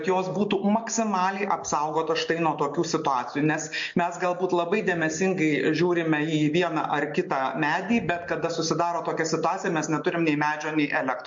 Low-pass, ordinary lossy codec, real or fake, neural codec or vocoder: 7.2 kHz; MP3, 48 kbps; real; none